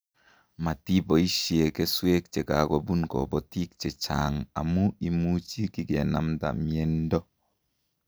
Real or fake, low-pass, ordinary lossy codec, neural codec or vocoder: real; none; none; none